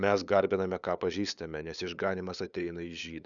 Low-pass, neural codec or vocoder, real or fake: 7.2 kHz; codec, 16 kHz, 8 kbps, FunCodec, trained on LibriTTS, 25 frames a second; fake